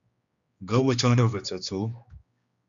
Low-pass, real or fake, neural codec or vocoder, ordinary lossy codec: 7.2 kHz; fake; codec, 16 kHz, 2 kbps, X-Codec, HuBERT features, trained on general audio; Opus, 64 kbps